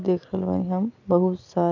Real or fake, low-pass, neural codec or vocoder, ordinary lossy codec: real; 7.2 kHz; none; none